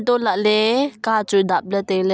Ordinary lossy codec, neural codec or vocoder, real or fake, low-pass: none; none; real; none